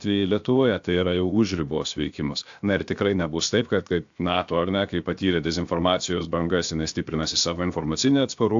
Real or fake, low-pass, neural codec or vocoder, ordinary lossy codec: fake; 7.2 kHz; codec, 16 kHz, 0.7 kbps, FocalCodec; AAC, 64 kbps